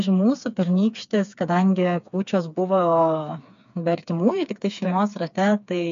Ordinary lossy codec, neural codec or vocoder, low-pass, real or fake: MP3, 64 kbps; codec, 16 kHz, 4 kbps, FreqCodec, smaller model; 7.2 kHz; fake